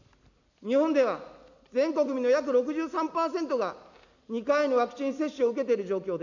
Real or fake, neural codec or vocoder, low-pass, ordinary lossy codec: real; none; 7.2 kHz; none